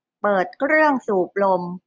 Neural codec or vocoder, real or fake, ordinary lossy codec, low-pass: none; real; none; none